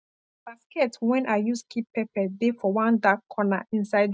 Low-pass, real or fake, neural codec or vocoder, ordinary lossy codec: none; real; none; none